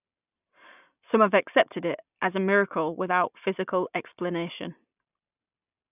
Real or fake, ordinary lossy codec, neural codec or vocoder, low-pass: real; none; none; 3.6 kHz